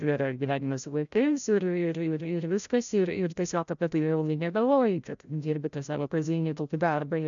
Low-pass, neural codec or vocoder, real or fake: 7.2 kHz; codec, 16 kHz, 0.5 kbps, FreqCodec, larger model; fake